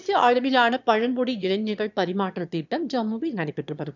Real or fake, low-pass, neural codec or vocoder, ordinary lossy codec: fake; 7.2 kHz; autoencoder, 22.05 kHz, a latent of 192 numbers a frame, VITS, trained on one speaker; none